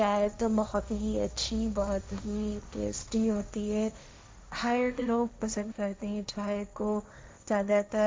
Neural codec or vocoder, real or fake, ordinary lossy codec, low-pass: codec, 16 kHz, 1.1 kbps, Voila-Tokenizer; fake; none; none